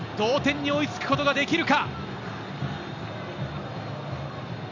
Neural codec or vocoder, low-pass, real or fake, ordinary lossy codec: none; 7.2 kHz; real; none